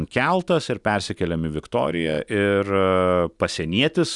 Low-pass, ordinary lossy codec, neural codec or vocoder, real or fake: 10.8 kHz; Opus, 64 kbps; none; real